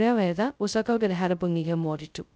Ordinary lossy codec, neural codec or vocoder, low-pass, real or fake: none; codec, 16 kHz, 0.2 kbps, FocalCodec; none; fake